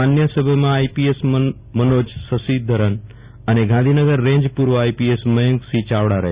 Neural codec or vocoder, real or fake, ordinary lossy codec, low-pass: none; real; Opus, 64 kbps; 3.6 kHz